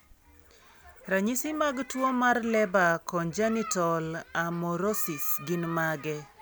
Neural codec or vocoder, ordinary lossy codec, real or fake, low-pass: none; none; real; none